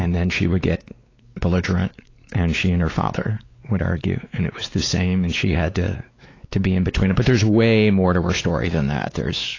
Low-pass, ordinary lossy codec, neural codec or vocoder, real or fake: 7.2 kHz; AAC, 32 kbps; codec, 16 kHz, 4 kbps, X-Codec, WavLM features, trained on Multilingual LibriSpeech; fake